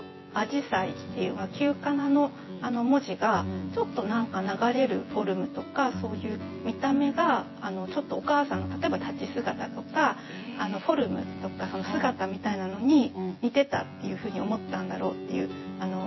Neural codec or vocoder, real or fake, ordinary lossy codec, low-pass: vocoder, 24 kHz, 100 mel bands, Vocos; fake; MP3, 24 kbps; 7.2 kHz